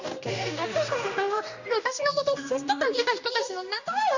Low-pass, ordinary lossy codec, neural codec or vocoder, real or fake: 7.2 kHz; none; codec, 16 kHz, 1 kbps, X-Codec, HuBERT features, trained on general audio; fake